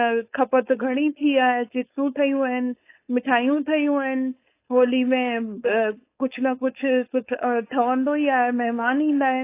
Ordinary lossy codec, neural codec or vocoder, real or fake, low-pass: AAC, 24 kbps; codec, 16 kHz, 4.8 kbps, FACodec; fake; 3.6 kHz